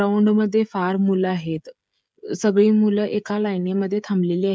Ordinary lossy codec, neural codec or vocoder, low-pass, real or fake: none; codec, 16 kHz, 16 kbps, FreqCodec, smaller model; none; fake